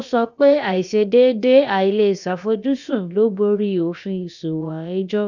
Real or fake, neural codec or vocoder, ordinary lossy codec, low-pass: fake; codec, 16 kHz, about 1 kbps, DyCAST, with the encoder's durations; none; 7.2 kHz